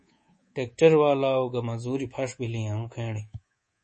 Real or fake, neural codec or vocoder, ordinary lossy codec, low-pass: fake; codec, 24 kHz, 3.1 kbps, DualCodec; MP3, 32 kbps; 10.8 kHz